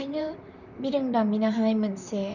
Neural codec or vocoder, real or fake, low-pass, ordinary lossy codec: vocoder, 44.1 kHz, 128 mel bands, Pupu-Vocoder; fake; 7.2 kHz; none